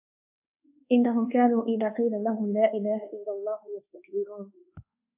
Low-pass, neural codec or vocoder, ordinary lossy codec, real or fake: 3.6 kHz; codec, 24 kHz, 1.2 kbps, DualCodec; AAC, 32 kbps; fake